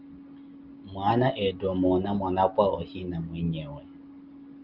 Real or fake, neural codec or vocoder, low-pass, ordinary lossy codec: real; none; 5.4 kHz; Opus, 32 kbps